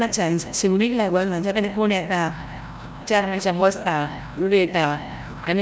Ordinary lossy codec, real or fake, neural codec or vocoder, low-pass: none; fake; codec, 16 kHz, 0.5 kbps, FreqCodec, larger model; none